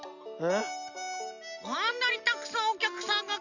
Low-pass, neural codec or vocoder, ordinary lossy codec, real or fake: 7.2 kHz; none; none; real